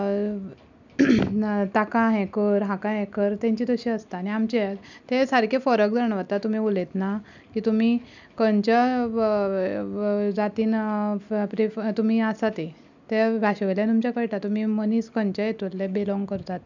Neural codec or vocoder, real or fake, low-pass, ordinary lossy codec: none; real; 7.2 kHz; none